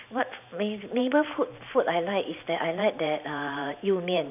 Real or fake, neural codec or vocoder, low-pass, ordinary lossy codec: fake; vocoder, 44.1 kHz, 128 mel bands every 512 samples, BigVGAN v2; 3.6 kHz; none